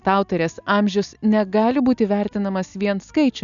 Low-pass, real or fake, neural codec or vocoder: 7.2 kHz; real; none